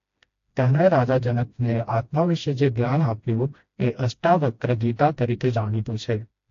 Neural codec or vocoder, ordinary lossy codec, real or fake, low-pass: codec, 16 kHz, 1 kbps, FreqCodec, smaller model; AAC, 48 kbps; fake; 7.2 kHz